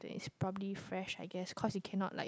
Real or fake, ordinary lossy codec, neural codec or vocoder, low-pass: real; none; none; none